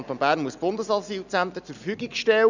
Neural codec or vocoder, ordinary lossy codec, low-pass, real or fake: none; none; 7.2 kHz; real